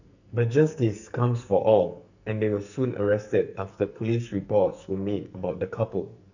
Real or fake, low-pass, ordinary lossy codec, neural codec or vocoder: fake; 7.2 kHz; none; codec, 44.1 kHz, 2.6 kbps, SNAC